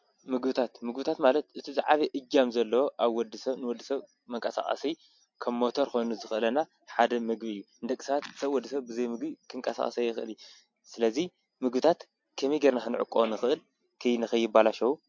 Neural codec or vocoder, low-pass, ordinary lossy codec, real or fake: none; 7.2 kHz; MP3, 48 kbps; real